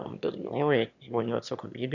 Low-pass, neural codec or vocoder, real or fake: 7.2 kHz; autoencoder, 22.05 kHz, a latent of 192 numbers a frame, VITS, trained on one speaker; fake